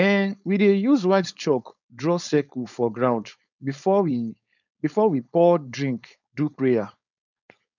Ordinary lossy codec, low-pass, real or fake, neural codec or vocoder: none; 7.2 kHz; fake; codec, 16 kHz, 4.8 kbps, FACodec